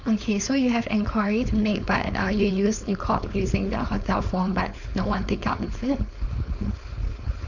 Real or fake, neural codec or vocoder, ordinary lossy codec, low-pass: fake; codec, 16 kHz, 4.8 kbps, FACodec; none; 7.2 kHz